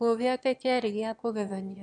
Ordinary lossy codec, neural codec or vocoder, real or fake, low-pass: MP3, 96 kbps; autoencoder, 22.05 kHz, a latent of 192 numbers a frame, VITS, trained on one speaker; fake; 9.9 kHz